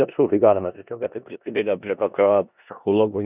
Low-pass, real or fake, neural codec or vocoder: 3.6 kHz; fake; codec, 16 kHz in and 24 kHz out, 0.4 kbps, LongCat-Audio-Codec, four codebook decoder